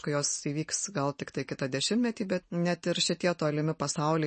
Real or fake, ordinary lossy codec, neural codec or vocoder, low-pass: real; MP3, 32 kbps; none; 10.8 kHz